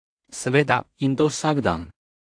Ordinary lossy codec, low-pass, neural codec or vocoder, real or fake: AAC, 64 kbps; 9.9 kHz; codec, 16 kHz in and 24 kHz out, 0.4 kbps, LongCat-Audio-Codec, two codebook decoder; fake